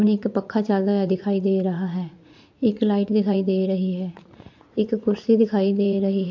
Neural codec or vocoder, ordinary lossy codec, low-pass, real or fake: vocoder, 44.1 kHz, 80 mel bands, Vocos; MP3, 48 kbps; 7.2 kHz; fake